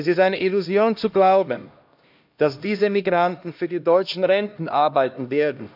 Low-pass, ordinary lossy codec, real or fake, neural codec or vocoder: 5.4 kHz; AAC, 48 kbps; fake; codec, 16 kHz, 1 kbps, X-Codec, HuBERT features, trained on LibriSpeech